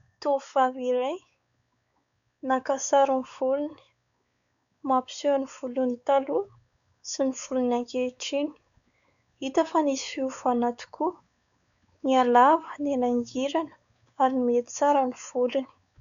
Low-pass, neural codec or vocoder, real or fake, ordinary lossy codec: 7.2 kHz; codec, 16 kHz, 4 kbps, X-Codec, WavLM features, trained on Multilingual LibriSpeech; fake; MP3, 96 kbps